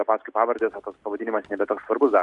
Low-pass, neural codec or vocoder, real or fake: 10.8 kHz; none; real